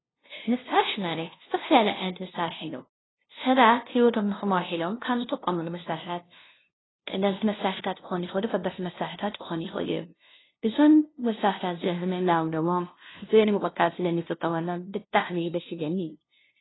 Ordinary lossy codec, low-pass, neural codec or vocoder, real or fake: AAC, 16 kbps; 7.2 kHz; codec, 16 kHz, 0.5 kbps, FunCodec, trained on LibriTTS, 25 frames a second; fake